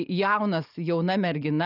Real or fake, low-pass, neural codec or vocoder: real; 5.4 kHz; none